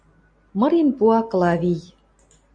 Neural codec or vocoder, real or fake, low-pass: none; real; 9.9 kHz